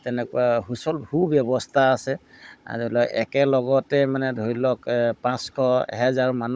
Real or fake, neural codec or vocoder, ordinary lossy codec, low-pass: fake; codec, 16 kHz, 16 kbps, FunCodec, trained on Chinese and English, 50 frames a second; none; none